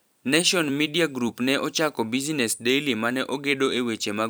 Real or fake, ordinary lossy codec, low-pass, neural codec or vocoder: fake; none; none; vocoder, 44.1 kHz, 128 mel bands every 256 samples, BigVGAN v2